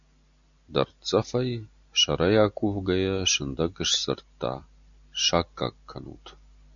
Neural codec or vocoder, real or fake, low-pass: none; real; 7.2 kHz